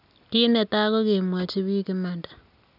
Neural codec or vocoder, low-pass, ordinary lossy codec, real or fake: none; 5.4 kHz; none; real